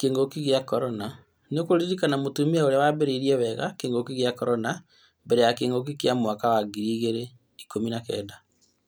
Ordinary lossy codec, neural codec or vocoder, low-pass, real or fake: none; none; none; real